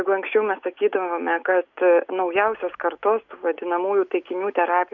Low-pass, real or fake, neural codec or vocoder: 7.2 kHz; real; none